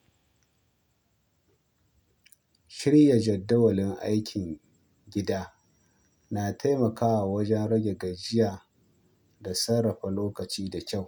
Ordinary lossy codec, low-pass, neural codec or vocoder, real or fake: none; 19.8 kHz; none; real